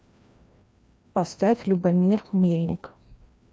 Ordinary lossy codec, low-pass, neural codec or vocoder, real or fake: none; none; codec, 16 kHz, 1 kbps, FreqCodec, larger model; fake